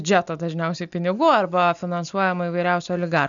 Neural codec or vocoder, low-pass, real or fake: none; 7.2 kHz; real